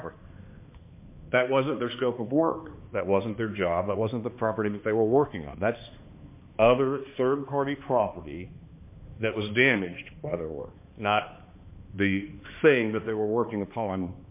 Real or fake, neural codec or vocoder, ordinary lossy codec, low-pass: fake; codec, 16 kHz, 2 kbps, X-Codec, HuBERT features, trained on balanced general audio; MP3, 24 kbps; 3.6 kHz